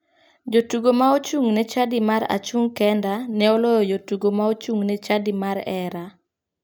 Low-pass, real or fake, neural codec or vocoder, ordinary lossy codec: none; real; none; none